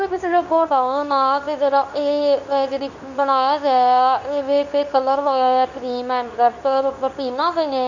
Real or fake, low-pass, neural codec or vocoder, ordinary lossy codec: fake; 7.2 kHz; codec, 24 kHz, 0.9 kbps, WavTokenizer, medium speech release version 2; none